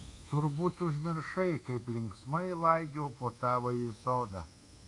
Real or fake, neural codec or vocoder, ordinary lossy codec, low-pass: fake; codec, 24 kHz, 1.2 kbps, DualCodec; AAC, 48 kbps; 10.8 kHz